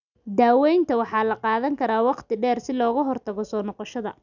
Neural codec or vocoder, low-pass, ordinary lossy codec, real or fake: none; 7.2 kHz; none; real